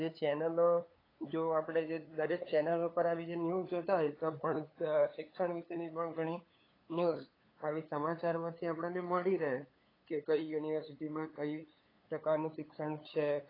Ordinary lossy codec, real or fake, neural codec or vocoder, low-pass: AAC, 24 kbps; fake; codec, 16 kHz, 8 kbps, FunCodec, trained on LibriTTS, 25 frames a second; 5.4 kHz